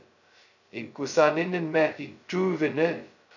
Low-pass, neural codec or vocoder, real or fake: 7.2 kHz; codec, 16 kHz, 0.2 kbps, FocalCodec; fake